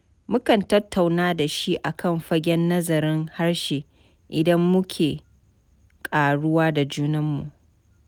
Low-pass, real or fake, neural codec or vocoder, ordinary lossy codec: none; real; none; none